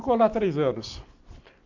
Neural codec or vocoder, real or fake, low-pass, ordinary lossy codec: none; real; 7.2 kHz; MP3, 48 kbps